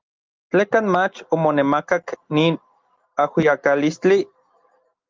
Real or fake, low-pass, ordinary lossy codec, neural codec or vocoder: real; 7.2 kHz; Opus, 24 kbps; none